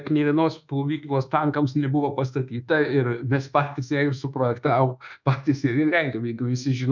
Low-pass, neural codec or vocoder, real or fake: 7.2 kHz; codec, 24 kHz, 1.2 kbps, DualCodec; fake